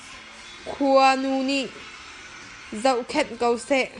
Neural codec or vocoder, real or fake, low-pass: none; real; 10.8 kHz